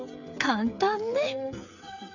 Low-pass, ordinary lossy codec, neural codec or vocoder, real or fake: 7.2 kHz; none; codec, 16 kHz, 16 kbps, FreqCodec, smaller model; fake